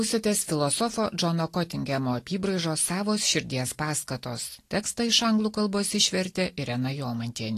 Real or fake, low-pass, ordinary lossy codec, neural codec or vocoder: real; 14.4 kHz; AAC, 48 kbps; none